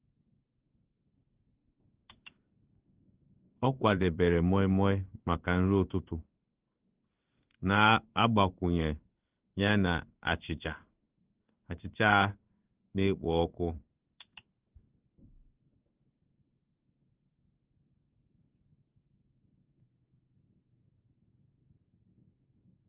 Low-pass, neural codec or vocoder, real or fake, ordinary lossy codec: 3.6 kHz; codec, 16 kHz in and 24 kHz out, 1 kbps, XY-Tokenizer; fake; Opus, 32 kbps